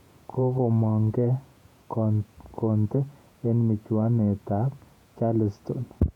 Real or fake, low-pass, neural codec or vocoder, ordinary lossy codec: fake; 19.8 kHz; vocoder, 48 kHz, 128 mel bands, Vocos; none